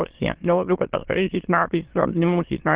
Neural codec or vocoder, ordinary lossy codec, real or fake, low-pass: autoencoder, 22.05 kHz, a latent of 192 numbers a frame, VITS, trained on many speakers; Opus, 16 kbps; fake; 3.6 kHz